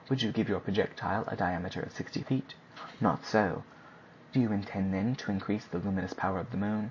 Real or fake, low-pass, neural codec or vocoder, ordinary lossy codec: real; 7.2 kHz; none; MP3, 32 kbps